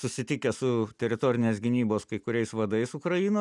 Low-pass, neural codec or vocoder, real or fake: 10.8 kHz; none; real